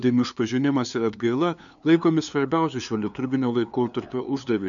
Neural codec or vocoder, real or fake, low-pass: codec, 16 kHz, 2 kbps, FunCodec, trained on LibriTTS, 25 frames a second; fake; 7.2 kHz